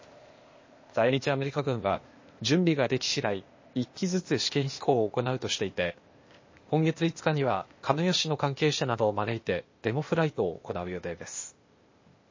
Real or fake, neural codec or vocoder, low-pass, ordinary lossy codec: fake; codec, 16 kHz, 0.8 kbps, ZipCodec; 7.2 kHz; MP3, 32 kbps